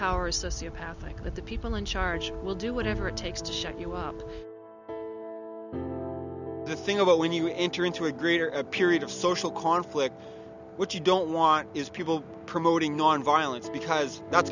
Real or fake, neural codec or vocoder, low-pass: real; none; 7.2 kHz